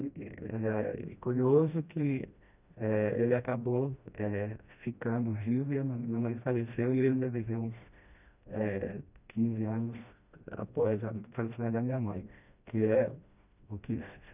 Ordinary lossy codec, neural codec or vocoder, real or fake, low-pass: none; codec, 16 kHz, 1 kbps, FreqCodec, smaller model; fake; 3.6 kHz